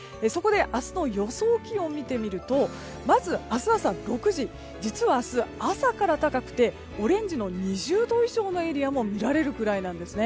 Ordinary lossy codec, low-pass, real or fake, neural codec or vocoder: none; none; real; none